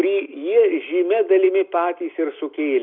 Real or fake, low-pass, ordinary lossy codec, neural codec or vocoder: real; 5.4 kHz; Opus, 64 kbps; none